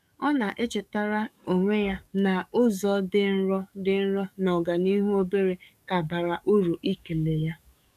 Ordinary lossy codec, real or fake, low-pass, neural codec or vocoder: none; fake; 14.4 kHz; codec, 44.1 kHz, 7.8 kbps, DAC